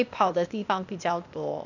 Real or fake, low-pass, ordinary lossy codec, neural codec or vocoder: fake; 7.2 kHz; none; codec, 16 kHz, 0.8 kbps, ZipCodec